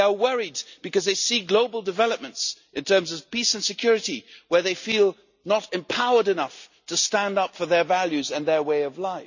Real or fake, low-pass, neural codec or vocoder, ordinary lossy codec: real; 7.2 kHz; none; none